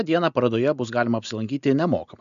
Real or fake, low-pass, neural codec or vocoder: real; 7.2 kHz; none